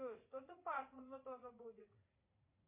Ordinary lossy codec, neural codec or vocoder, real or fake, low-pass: MP3, 24 kbps; vocoder, 44.1 kHz, 80 mel bands, Vocos; fake; 3.6 kHz